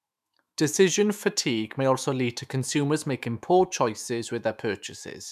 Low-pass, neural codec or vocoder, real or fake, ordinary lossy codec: 14.4 kHz; autoencoder, 48 kHz, 128 numbers a frame, DAC-VAE, trained on Japanese speech; fake; none